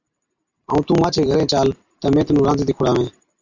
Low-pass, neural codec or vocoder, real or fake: 7.2 kHz; none; real